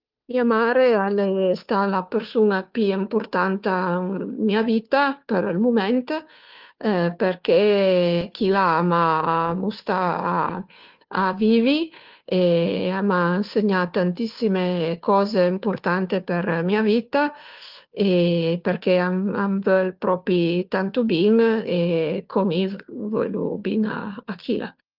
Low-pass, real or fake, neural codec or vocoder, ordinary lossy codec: 5.4 kHz; fake; codec, 16 kHz, 2 kbps, FunCodec, trained on Chinese and English, 25 frames a second; Opus, 24 kbps